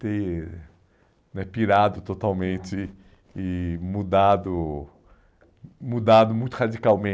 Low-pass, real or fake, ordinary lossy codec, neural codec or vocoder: none; real; none; none